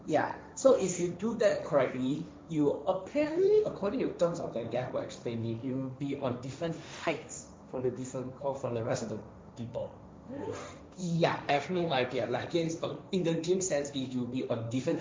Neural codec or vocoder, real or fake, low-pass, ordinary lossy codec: codec, 16 kHz, 1.1 kbps, Voila-Tokenizer; fake; none; none